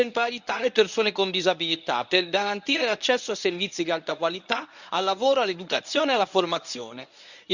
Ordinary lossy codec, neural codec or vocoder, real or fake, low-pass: none; codec, 24 kHz, 0.9 kbps, WavTokenizer, medium speech release version 1; fake; 7.2 kHz